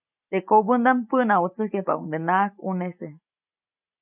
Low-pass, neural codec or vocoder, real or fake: 3.6 kHz; none; real